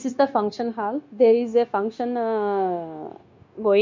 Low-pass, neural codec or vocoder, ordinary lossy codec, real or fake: 7.2 kHz; codec, 16 kHz, 0.9 kbps, LongCat-Audio-Codec; MP3, 48 kbps; fake